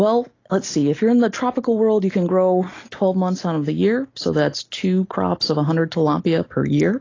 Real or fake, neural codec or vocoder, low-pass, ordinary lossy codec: real; none; 7.2 kHz; AAC, 32 kbps